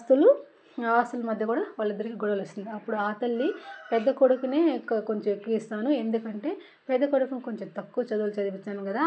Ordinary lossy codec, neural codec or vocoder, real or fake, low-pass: none; none; real; none